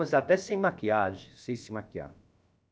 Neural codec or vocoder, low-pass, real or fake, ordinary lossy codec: codec, 16 kHz, about 1 kbps, DyCAST, with the encoder's durations; none; fake; none